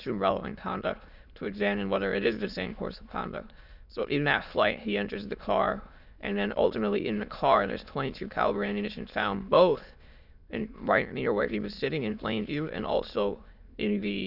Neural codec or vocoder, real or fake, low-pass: autoencoder, 22.05 kHz, a latent of 192 numbers a frame, VITS, trained on many speakers; fake; 5.4 kHz